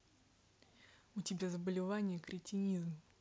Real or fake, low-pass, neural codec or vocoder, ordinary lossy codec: real; none; none; none